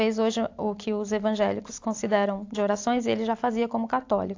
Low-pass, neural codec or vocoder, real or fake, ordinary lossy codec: 7.2 kHz; none; real; AAC, 48 kbps